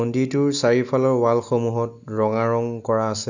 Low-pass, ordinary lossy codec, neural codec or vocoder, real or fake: 7.2 kHz; none; none; real